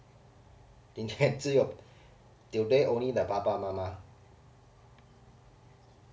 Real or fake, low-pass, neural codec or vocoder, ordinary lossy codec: real; none; none; none